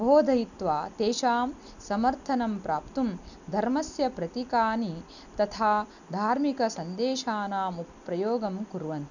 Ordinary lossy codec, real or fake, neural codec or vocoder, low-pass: none; real; none; 7.2 kHz